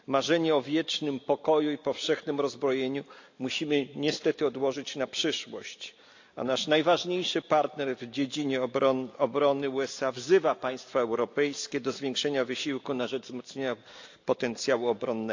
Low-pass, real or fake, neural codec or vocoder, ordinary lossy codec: 7.2 kHz; real; none; AAC, 48 kbps